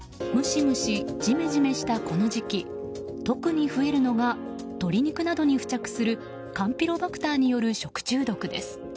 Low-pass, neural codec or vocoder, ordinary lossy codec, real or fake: none; none; none; real